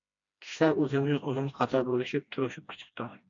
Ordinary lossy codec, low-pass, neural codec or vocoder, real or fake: MP3, 64 kbps; 7.2 kHz; codec, 16 kHz, 1 kbps, FreqCodec, smaller model; fake